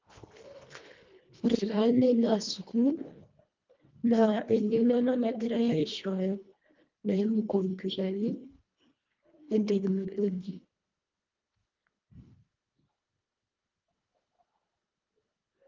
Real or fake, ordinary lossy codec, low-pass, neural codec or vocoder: fake; Opus, 24 kbps; 7.2 kHz; codec, 24 kHz, 1.5 kbps, HILCodec